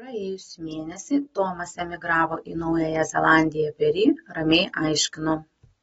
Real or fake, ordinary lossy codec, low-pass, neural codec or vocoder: real; AAC, 24 kbps; 19.8 kHz; none